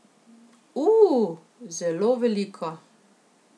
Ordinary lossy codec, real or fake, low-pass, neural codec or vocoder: none; real; none; none